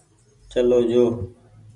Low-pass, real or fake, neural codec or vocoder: 10.8 kHz; real; none